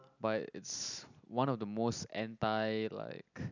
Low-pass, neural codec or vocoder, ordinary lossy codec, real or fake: 7.2 kHz; none; none; real